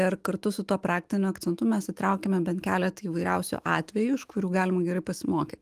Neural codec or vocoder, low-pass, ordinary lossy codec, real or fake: autoencoder, 48 kHz, 128 numbers a frame, DAC-VAE, trained on Japanese speech; 14.4 kHz; Opus, 24 kbps; fake